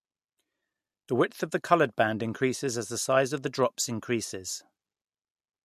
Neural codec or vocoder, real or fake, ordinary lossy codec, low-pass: none; real; MP3, 64 kbps; 14.4 kHz